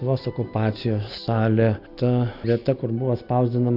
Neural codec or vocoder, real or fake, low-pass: none; real; 5.4 kHz